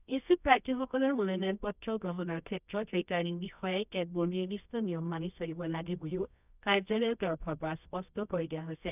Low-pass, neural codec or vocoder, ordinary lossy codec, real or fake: 3.6 kHz; codec, 24 kHz, 0.9 kbps, WavTokenizer, medium music audio release; none; fake